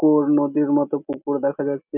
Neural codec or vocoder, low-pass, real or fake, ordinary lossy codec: none; 3.6 kHz; real; none